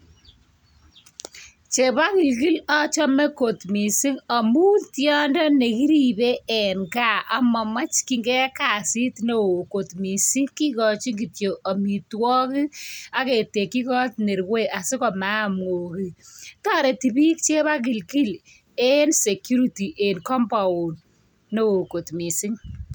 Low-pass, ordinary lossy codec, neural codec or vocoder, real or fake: none; none; none; real